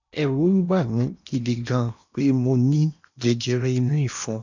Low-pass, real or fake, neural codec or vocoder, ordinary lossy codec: 7.2 kHz; fake; codec, 16 kHz in and 24 kHz out, 0.8 kbps, FocalCodec, streaming, 65536 codes; none